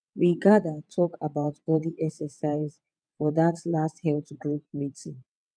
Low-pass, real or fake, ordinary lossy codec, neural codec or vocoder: 9.9 kHz; fake; none; vocoder, 22.05 kHz, 80 mel bands, WaveNeXt